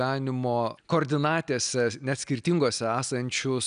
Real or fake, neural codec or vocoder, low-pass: real; none; 9.9 kHz